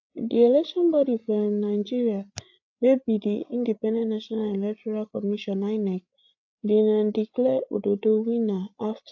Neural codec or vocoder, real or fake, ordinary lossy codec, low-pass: codec, 16 kHz, 8 kbps, FreqCodec, larger model; fake; AAC, 48 kbps; 7.2 kHz